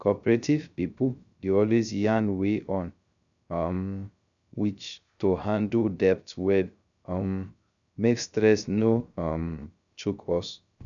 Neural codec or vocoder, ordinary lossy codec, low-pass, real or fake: codec, 16 kHz, 0.3 kbps, FocalCodec; none; 7.2 kHz; fake